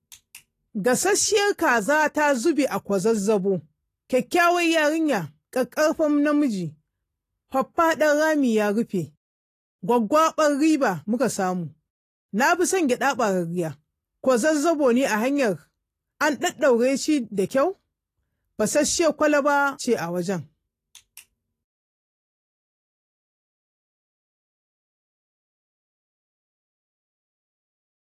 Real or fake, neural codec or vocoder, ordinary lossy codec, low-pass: real; none; AAC, 48 kbps; 14.4 kHz